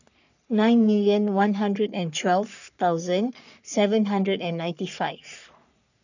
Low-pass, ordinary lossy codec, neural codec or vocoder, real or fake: 7.2 kHz; none; codec, 44.1 kHz, 3.4 kbps, Pupu-Codec; fake